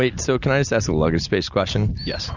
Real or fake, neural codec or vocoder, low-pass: real; none; 7.2 kHz